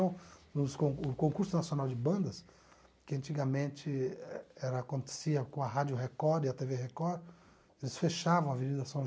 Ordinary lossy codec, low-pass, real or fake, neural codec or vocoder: none; none; real; none